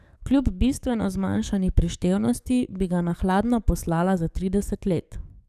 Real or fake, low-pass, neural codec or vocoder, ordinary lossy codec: fake; 14.4 kHz; codec, 44.1 kHz, 7.8 kbps, DAC; none